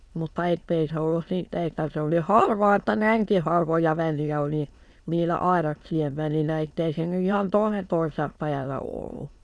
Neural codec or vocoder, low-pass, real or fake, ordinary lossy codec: autoencoder, 22.05 kHz, a latent of 192 numbers a frame, VITS, trained on many speakers; none; fake; none